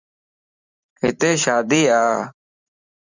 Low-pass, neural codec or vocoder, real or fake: 7.2 kHz; vocoder, 44.1 kHz, 128 mel bands every 512 samples, BigVGAN v2; fake